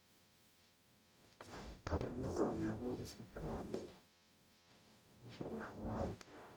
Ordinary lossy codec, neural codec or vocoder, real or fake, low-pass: none; codec, 44.1 kHz, 0.9 kbps, DAC; fake; none